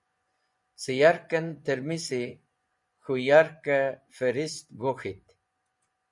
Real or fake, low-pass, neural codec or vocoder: fake; 10.8 kHz; vocoder, 24 kHz, 100 mel bands, Vocos